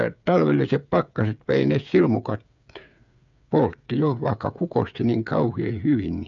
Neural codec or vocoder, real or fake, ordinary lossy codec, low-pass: codec, 16 kHz, 8 kbps, FreqCodec, smaller model; fake; none; 7.2 kHz